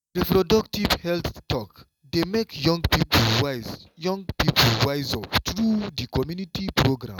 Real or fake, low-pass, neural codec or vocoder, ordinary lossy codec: real; 19.8 kHz; none; none